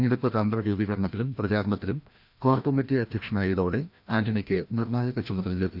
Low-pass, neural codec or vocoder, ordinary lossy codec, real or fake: 5.4 kHz; codec, 16 kHz, 1 kbps, FreqCodec, larger model; none; fake